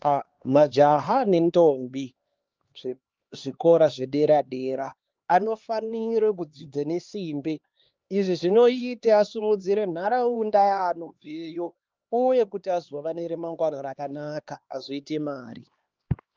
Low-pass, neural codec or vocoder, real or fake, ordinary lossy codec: 7.2 kHz; codec, 16 kHz, 2 kbps, X-Codec, HuBERT features, trained on LibriSpeech; fake; Opus, 32 kbps